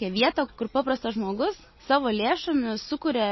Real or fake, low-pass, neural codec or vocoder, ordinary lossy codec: real; 7.2 kHz; none; MP3, 24 kbps